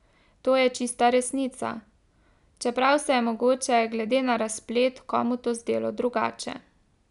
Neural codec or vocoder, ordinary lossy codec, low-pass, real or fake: none; none; 10.8 kHz; real